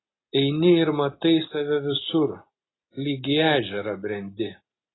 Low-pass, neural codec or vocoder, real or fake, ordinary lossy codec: 7.2 kHz; none; real; AAC, 16 kbps